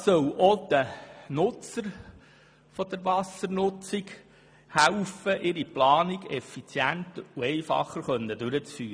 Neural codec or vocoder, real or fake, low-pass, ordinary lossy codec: none; real; none; none